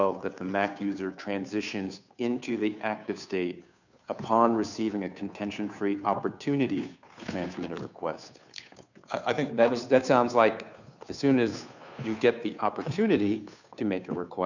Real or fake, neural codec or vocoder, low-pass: fake; codec, 16 kHz, 2 kbps, FunCodec, trained on Chinese and English, 25 frames a second; 7.2 kHz